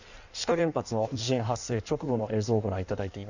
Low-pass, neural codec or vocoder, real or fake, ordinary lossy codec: 7.2 kHz; codec, 16 kHz in and 24 kHz out, 1.1 kbps, FireRedTTS-2 codec; fake; none